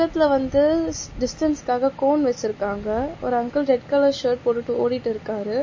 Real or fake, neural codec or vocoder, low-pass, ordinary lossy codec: real; none; 7.2 kHz; MP3, 32 kbps